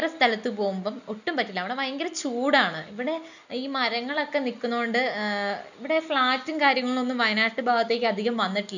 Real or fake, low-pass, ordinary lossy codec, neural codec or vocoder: real; 7.2 kHz; none; none